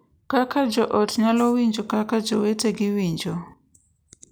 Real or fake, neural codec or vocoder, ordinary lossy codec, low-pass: real; none; none; none